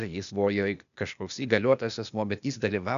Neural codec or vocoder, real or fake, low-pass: codec, 16 kHz, 0.8 kbps, ZipCodec; fake; 7.2 kHz